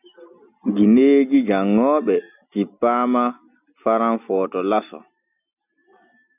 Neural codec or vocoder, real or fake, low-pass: none; real; 3.6 kHz